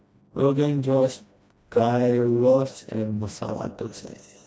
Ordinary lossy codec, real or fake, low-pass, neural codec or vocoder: none; fake; none; codec, 16 kHz, 1 kbps, FreqCodec, smaller model